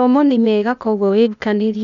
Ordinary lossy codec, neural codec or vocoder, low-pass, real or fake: none; codec, 16 kHz, 0.8 kbps, ZipCodec; 7.2 kHz; fake